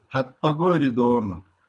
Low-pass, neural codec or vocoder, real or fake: 10.8 kHz; codec, 24 kHz, 3 kbps, HILCodec; fake